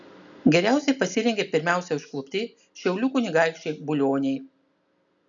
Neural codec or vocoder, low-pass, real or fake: none; 7.2 kHz; real